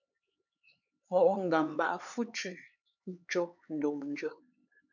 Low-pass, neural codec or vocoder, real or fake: 7.2 kHz; codec, 16 kHz, 4 kbps, X-Codec, HuBERT features, trained on LibriSpeech; fake